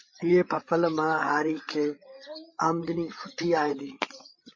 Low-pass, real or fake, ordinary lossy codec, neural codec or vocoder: 7.2 kHz; fake; MP3, 32 kbps; codec, 16 kHz, 8 kbps, FreqCodec, larger model